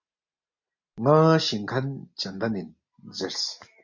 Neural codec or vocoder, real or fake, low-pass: none; real; 7.2 kHz